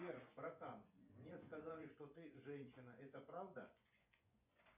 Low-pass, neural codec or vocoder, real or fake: 3.6 kHz; vocoder, 24 kHz, 100 mel bands, Vocos; fake